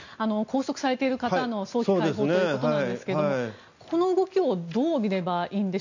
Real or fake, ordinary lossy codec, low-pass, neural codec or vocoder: real; none; 7.2 kHz; none